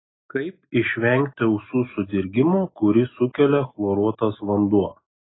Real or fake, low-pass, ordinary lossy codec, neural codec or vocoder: real; 7.2 kHz; AAC, 16 kbps; none